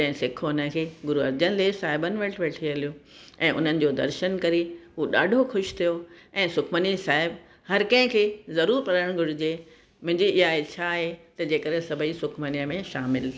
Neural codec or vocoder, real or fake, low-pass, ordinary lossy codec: none; real; none; none